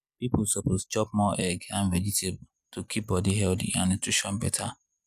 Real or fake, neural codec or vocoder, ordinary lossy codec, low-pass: real; none; none; 14.4 kHz